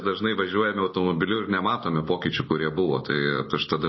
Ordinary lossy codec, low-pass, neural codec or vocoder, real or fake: MP3, 24 kbps; 7.2 kHz; none; real